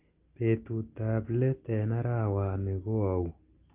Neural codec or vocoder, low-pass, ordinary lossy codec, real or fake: none; 3.6 kHz; Opus, 32 kbps; real